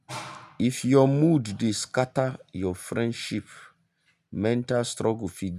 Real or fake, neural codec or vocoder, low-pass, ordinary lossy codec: real; none; 14.4 kHz; none